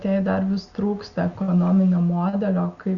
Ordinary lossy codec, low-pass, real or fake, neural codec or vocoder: Opus, 64 kbps; 7.2 kHz; real; none